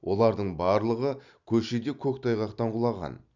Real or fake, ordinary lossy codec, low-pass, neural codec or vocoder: real; none; 7.2 kHz; none